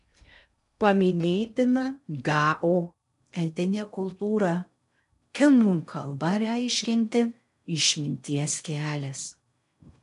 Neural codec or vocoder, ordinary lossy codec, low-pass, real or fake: codec, 16 kHz in and 24 kHz out, 0.6 kbps, FocalCodec, streaming, 2048 codes; MP3, 96 kbps; 10.8 kHz; fake